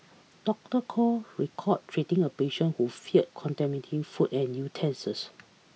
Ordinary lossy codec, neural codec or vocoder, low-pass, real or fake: none; none; none; real